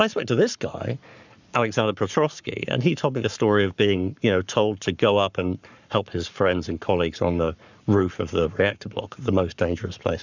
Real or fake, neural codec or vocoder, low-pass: fake; codec, 44.1 kHz, 7.8 kbps, Pupu-Codec; 7.2 kHz